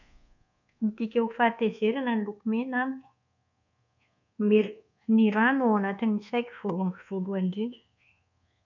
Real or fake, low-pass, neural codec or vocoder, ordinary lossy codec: fake; 7.2 kHz; codec, 24 kHz, 1.2 kbps, DualCodec; none